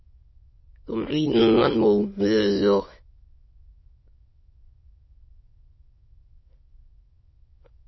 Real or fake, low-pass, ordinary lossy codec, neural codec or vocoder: fake; 7.2 kHz; MP3, 24 kbps; autoencoder, 22.05 kHz, a latent of 192 numbers a frame, VITS, trained on many speakers